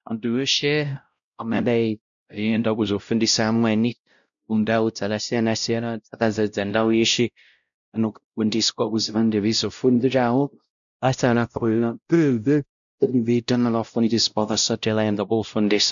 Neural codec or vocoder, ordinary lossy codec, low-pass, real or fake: codec, 16 kHz, 0.5 kbps, X-Codec, WavLM features, trained on Multilingual LibriSpeech; MP3, 96 kbps; 7.2 kHz; fake